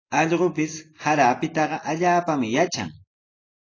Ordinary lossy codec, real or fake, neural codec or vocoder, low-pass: AAC, 32 kbps; real; none; 7.2 kHz